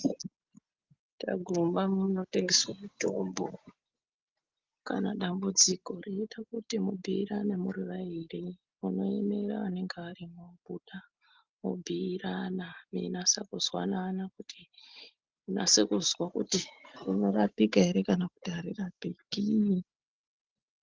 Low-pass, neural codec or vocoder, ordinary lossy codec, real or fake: 7.2 kHz; none; Opus, 24 kbps; real